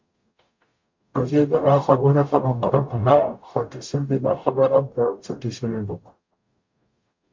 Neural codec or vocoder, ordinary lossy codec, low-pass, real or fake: codec, 44.1 kHz, 0.9 kbps, DAC; MP3, 48 kbps; 7.2 kHz; fake